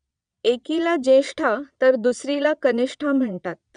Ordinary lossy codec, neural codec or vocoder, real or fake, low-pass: none; vocoder, 22.05 kHz, 80 mel bands, Vocos; fake; 9.9 kHz